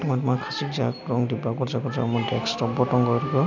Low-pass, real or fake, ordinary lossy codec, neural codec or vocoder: 7.2 kHz; real; none; none